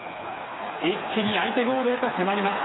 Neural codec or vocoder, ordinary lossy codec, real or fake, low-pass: codec, 16 kHz, 4 kbps, FreqCodec, smaller model; AAC, 16 kbps; fake; 7.2 kHz